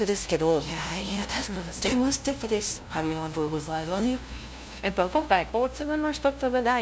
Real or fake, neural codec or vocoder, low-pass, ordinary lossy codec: fake; codec, 16 kHz, 0.5 kbps, FunCodec, trained on LibriTTS, 25 frames a second; none; none